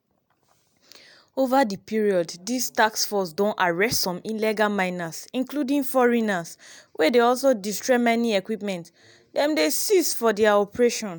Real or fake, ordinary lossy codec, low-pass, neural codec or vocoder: real; none; none; none